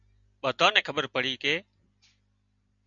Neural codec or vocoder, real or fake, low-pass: none; real; 7.2 kHz